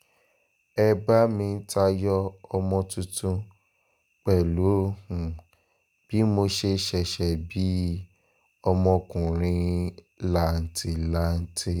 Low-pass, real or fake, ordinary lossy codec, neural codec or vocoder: none; real; none; none